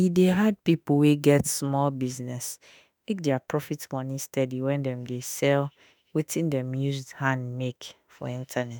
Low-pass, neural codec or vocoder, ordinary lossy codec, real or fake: none; autoencoder, 48 kHz, 32 numbers a frame, DAC-VAE, trained on Japanese speech; none; fake